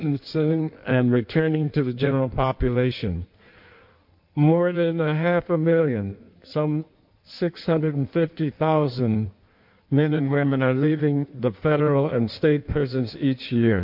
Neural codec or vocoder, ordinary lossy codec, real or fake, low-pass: codec, 16 kHz in and 24 kHz out, 1.1 kbps, FireRedTTS-2 codec; MP3, 48 kbps; fake; 5.4 kHz